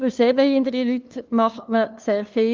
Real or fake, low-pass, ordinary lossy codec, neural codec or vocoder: fake; 7.2 kHz; Opus, 32 kbps; codec, 16 kHz, 2 kbps, FunCodec, trained on LibriTTS, 25 frames a second